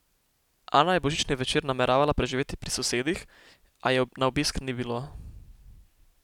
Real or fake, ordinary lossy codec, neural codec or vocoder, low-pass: real; none; none; 19.8 kHz